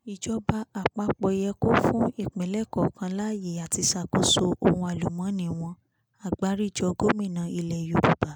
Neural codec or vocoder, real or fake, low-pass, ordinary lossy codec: none; real; none; none